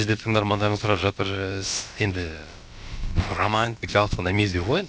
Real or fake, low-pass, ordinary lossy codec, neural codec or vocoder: fake; none; none; codec, 16 kHz, about 1 kbps, DyCAST, with the encoder's durations